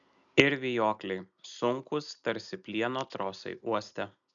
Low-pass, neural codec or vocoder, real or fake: 7.2 kHz; none; real